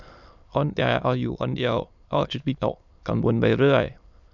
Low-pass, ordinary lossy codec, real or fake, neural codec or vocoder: 7.2 kHz; none; fake; autoencoder, 22.05 kHz, a latent of 192 numbers a frame, VITS, trained on many speakers